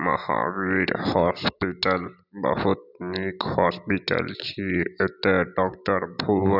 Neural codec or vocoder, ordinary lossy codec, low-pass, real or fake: none; none; 5.4 kHz; real